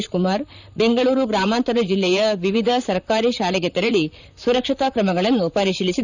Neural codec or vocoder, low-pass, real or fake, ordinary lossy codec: vocoder, 44.1 kHz, 128 mel bands, Pupu-Vocoder; 7.2 kHz; fake; none